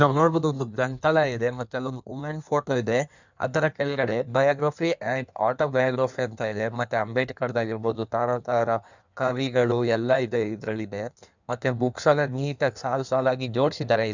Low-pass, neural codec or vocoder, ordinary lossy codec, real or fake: 7.2 kHz; codec, 16 kHz in and 24 kHz out, 1.1 kbps, FireRedTTS-2 codec; none; fake